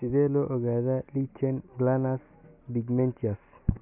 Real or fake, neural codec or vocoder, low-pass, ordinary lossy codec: real; none; 3.6 kHz; none